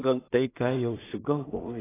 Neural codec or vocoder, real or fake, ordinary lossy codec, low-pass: codec, 16 kHz in and 24 kHz out, 0.4 kbps, LongCat-Audio-Codec, two codebook decoder; fake; AAC, 16 kbps; 3.6 kHz